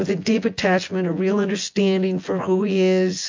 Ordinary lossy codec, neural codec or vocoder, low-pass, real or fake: MP3, 48 kbps; vocoder, 24 kHz, 100 mel bands, Vocos; 7.2 kHz; fake